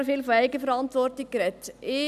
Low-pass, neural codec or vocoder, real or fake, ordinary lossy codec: 14.4 kHz; none; real; none